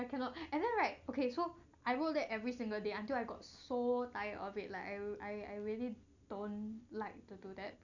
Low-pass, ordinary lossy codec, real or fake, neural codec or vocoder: 7.2 kHz; none; real; none